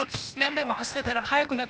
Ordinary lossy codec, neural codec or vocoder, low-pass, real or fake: none; codec, 16 kHz, 0.8 kbps, ZipCodec; none; fake